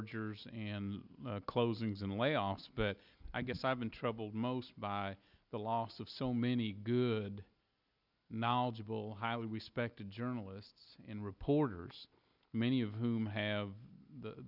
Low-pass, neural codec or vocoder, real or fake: 5.4 kHz; none; real